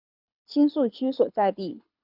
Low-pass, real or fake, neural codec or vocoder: 5.4 kHz; fake; codec, 24 kHz, 6 kbps, HILCodec